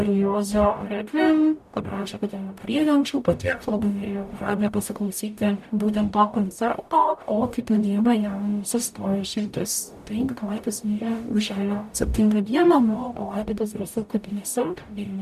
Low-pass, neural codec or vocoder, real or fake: 14.4 kHz; codec, 44.1 kHz, 0.9 kbps, DAC; fake